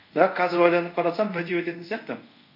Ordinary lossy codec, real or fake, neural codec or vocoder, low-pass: none; fake; codec, 24 kHz, 0.5 kbps, DualCodec; 5.4 kHz